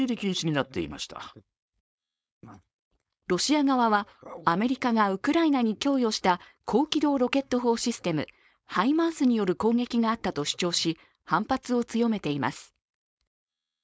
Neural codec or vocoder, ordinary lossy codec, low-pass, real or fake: codec, 16 kHz, 4.8 kbps, FACodec; none; none; fake